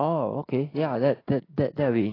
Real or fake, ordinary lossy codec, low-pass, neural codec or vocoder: fake; AAC, 24 kbps; 5.4 kHz; codec, 16 kHz, 6 kbps, DAC